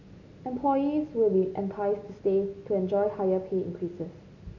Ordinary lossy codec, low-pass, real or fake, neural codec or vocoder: none; 7.2 kHz; real; none